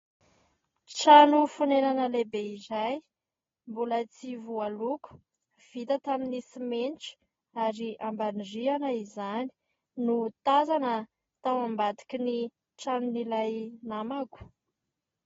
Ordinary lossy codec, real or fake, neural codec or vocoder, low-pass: AAC, 24 kbps; real; none; 7.2 kHz